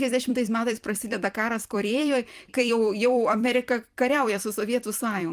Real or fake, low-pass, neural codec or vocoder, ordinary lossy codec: fake; 14.4 kHz; vocoder, 44.1 kHz, 128 mel bands every 256 samples, BigVGAN v2; Opus, 32 kbps